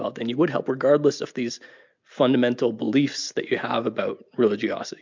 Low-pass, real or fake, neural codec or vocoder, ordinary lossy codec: 7.2 kHz; real; none; MP3, 64 kbps